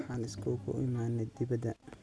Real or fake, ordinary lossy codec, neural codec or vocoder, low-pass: real; none; none; none